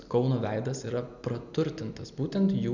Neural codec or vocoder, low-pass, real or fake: none; 7.2 kHz; real